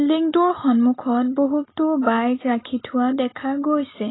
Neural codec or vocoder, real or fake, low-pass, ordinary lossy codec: none; real; 7.2 kHz; AAC, 16 kbps